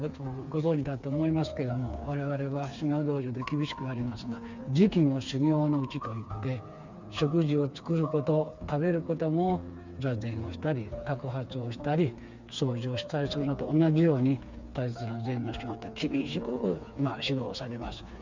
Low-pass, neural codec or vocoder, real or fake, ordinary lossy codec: 7.2 kHz; codec, 16 kHz, 4 kbps, FreqCodec, smaller model; fake; Opus, 64 kbps